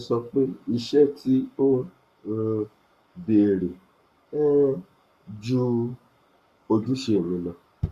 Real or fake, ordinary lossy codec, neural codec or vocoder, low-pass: fake; Opus, 64 kbps; codec, 44.1 kHz, 7.8 kbps, Pupu-Codec; 14.4 kHz